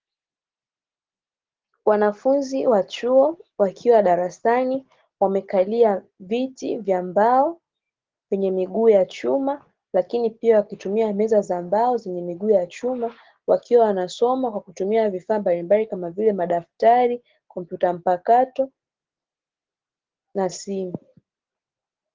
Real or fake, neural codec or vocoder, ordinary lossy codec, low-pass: real; none; Opus, 16 kbps; 7.2 kHz